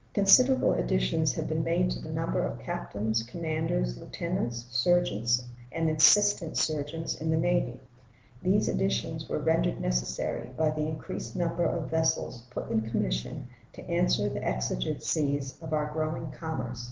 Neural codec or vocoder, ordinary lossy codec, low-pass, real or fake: none; Opus, 16 kbps; 7.2 kHz; real